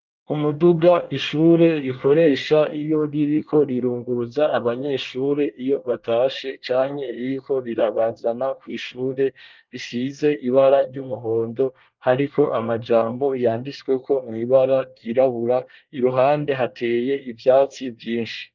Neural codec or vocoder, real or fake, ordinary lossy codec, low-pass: codec, 24 kHz, 1 kbps, SNAC; fake; Opus, 32 kbps; 7.2 kHz